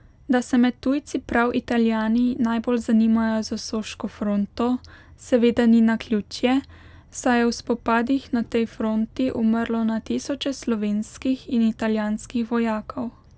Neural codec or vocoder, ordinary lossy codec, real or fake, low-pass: none; none; real; none